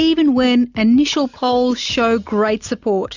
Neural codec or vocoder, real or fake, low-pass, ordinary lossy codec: none; real; 7.2 kHz; Opus, 64 kbps